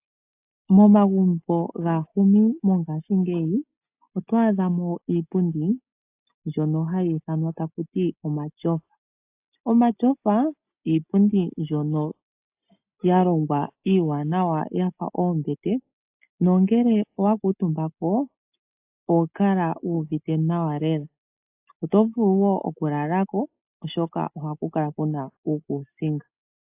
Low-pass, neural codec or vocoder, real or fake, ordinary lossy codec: 3.6 kHz; none; real; AAC, 32 kbps